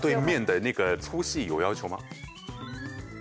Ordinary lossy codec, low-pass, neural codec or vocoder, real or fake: none; none; none; real